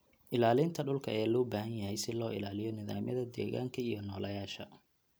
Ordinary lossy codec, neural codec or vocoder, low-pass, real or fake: none; none; none; real